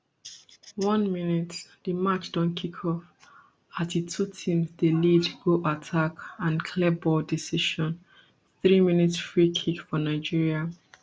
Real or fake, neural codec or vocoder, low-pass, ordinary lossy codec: real; none; none; none